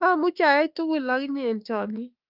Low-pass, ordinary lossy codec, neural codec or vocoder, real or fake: 5.4 kHz; Opus, 24 kbps; autoencoder, 48 kHz, 32 numbers a frame, DAC-VAE, trained on Japanese speech; fake